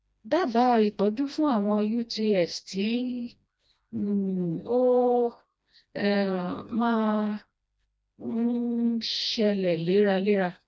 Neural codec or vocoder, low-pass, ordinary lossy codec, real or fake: codec, 16 kHz, 1 kbps, FreqCodec, smaller model; none; none; fake